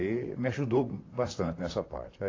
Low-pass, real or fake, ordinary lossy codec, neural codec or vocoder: 7.2 kHz; fake; AAC, 32 kbps; vocoder, 44.1 kHz, 128 mel bands, Pupu-Vocoder